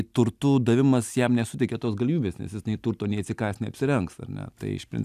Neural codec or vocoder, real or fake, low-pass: none; real; 14.4 kHz